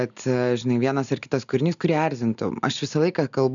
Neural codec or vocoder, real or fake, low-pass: none; real; 7.2 kHz